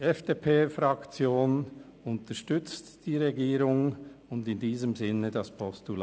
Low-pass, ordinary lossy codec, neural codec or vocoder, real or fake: none; none; none; real